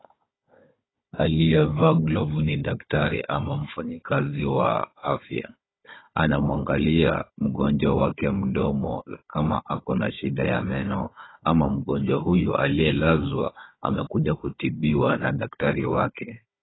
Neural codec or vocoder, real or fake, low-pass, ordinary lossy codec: codec, 16 kHz, 4 kbps, FunCodec, trained on Chinese and English, 50 frames a second; fake; 7.2 kHz; AAC, 16 kbps